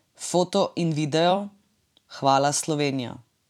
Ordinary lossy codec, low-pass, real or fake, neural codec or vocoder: none; 19.8 kHz; fake; vocoder, 44.1 kHz, 128 mel bands every 256 samples, BigVGAN v2